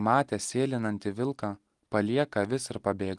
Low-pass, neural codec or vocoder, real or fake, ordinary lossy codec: 10.8 kHz; none; real; Opus, 24 kbps